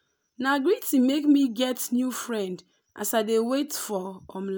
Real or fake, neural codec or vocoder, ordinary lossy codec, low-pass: real; none; none; none